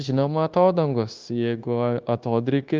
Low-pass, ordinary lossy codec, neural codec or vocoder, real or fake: 7.2 kHz; Opus, 24 kbps; codec, 16 kHz, 0.9 kbps, LongCat-Audio-Codec; fake